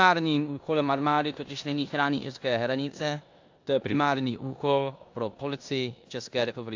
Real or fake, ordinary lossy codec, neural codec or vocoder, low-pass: fake; AAC, 48 kbps; codec, 16 kHz in and 24 kHz out, 0.9 kbps, LongCat-Audio-Codec, four codebook decoder; 7.2 kHz